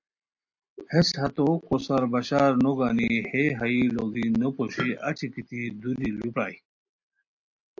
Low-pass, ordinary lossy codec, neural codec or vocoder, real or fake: 7.2 kHz; AAC, 48 kbps; none; real